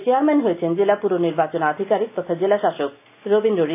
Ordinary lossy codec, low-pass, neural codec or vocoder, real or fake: AAC, 24 kbps; 3.6 kHz; none; real